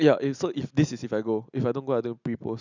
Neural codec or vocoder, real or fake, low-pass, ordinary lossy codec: none; real; 7.2 kHz; none